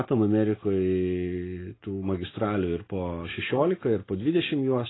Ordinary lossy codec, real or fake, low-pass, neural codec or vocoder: AAC, 16 kbps; real; 7.2 kHz; none